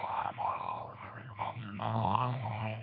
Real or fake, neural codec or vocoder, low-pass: fake; codec, 24 kHz, 0.9 kbps, WavTokenizer, small release; 5.4 kHz